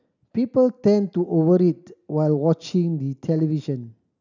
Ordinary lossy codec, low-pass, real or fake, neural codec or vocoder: none; 7.2 kHz; real; none